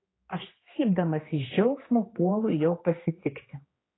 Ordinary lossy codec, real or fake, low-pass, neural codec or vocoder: AAC, 16 kbps; fake; 7.2 kHz; codec, 16 kHz, 2 kbps, X-Codec, HuBERT features, trained on general audio